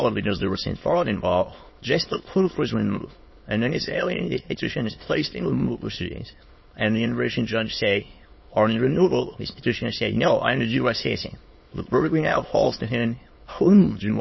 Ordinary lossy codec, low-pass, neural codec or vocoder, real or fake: MP3, 24 kbps; 7.2 kHz; autoencoder, 22.05 kHz, a latent of 192 numbers a frame, VITS, trained on many speakers; fake